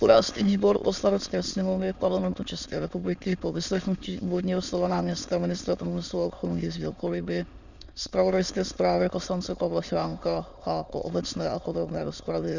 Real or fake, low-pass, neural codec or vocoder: fake; 7.2 kHz; autoencoder, 22.05 kHz, a latent of 192 numbers a frame, VITS, trained on many speakers